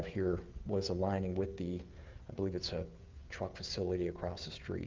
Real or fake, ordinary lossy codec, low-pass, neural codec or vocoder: fake; Opus, 32 kbps; 7.2 kHz; codec, 16 kHz, 16 kbps, FreqCodec, smaller model